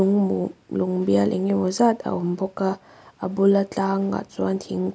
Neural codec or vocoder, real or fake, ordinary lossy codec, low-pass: none; real; none; none